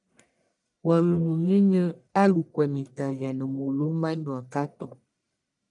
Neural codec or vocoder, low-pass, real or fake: codec, 44.1 kHz, 1.7 kbps, Pupu-Codec; 10.8 kHz; fake